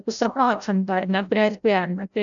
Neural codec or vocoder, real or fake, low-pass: codec, 16 kHz, 0.5 kbps, FreqCodec, larger model; fake; 7.2 kHz